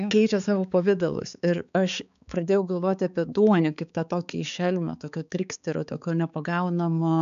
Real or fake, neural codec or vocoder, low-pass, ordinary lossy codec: fake; codec, 16 kHz, 4 kbps, X-Codec, HuBERT features, trained on balanced general audio; 7.2 kHz; AAC, 96 kbps